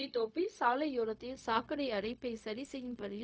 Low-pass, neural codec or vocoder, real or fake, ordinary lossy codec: none; codec, 16 kHz, 0.4 kbps, LongCat-Audio-Codec; fake; none